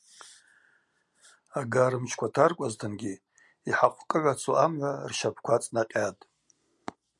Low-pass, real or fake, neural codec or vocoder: 10.8 kHz; real; none